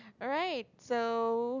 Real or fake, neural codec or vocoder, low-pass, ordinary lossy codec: real; none; 7.2 kHz; none